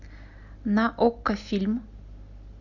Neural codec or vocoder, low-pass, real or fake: none; 7.2 kHz; real